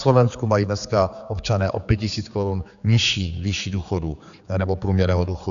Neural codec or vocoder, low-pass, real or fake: codec, 16 kHz, 4 kbps, X-Codec, HuBERT features, trained on general audio; 7.2 kHz; fake